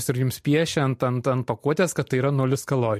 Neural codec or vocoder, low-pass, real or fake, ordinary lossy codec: none; 14.4 kHz; real; MP3, 64 kbps